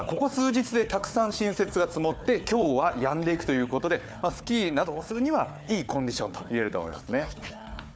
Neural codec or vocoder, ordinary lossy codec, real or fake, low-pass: codec, 16 kHz, 4 kbps, FunCodec, trained on Chinese and English, 50 frames a second; none; fake; none